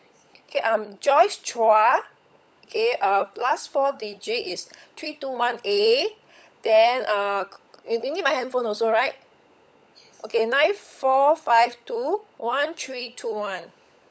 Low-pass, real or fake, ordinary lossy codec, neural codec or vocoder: none; fake; none; codec, 16 kHz, 16 kbps, FunCodec, trained on LibriTTS, 50 frames a second